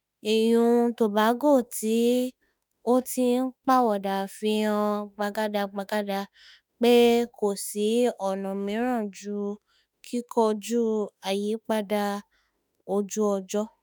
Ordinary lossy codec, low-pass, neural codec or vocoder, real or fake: none; none; autoencoder, 48 kHz, 32 numbers a frame, DAC-VAE, trained on Japanese speech; fake